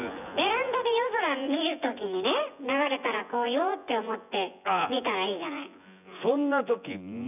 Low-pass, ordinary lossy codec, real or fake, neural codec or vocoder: 3.6 kHz; none; fake; vocoder, 24 kHz, 100 mel bands, Vocos